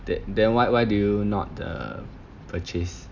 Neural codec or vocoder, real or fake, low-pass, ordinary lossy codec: none; real; 7.2 kHz; none